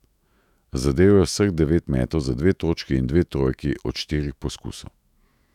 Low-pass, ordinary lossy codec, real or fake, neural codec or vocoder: 19.8 kHz; none; fake; autoencoder, 48 kHz, 128 numbers a frame, DAC-VAE, trained on Japanese speech